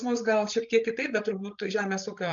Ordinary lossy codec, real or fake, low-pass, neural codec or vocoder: MP3, 64 kbps; fake; 7.2 kHz; codec, 16 kHz, 8 kbps, FreqCodec, larger model